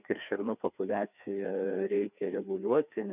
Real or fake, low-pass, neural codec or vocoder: fake; 3.6 kHz; codec, 16 kHz, 2 kbps, FreqCodec, larger model